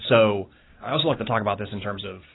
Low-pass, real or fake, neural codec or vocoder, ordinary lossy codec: 7.2 kHz; real; none; AAC, 16 kbps